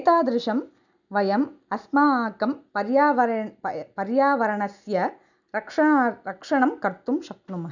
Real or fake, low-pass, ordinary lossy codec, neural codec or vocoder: real; 7.2 kHz; none; none